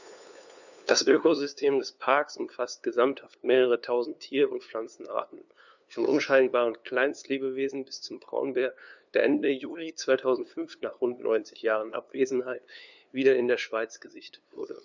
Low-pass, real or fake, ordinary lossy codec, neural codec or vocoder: 7.2 kHz; fake; none; codec, 16 kHz, 2 kbps, FunCodec, trained on LibriTTS, 25 frames a second